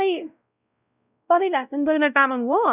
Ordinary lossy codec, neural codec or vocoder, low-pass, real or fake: none; codec, 16 kHz, 0.5 kbps, X-Codec, WavLM features, trained on Multilingual LibriSpeech; 3.6 kHz; fake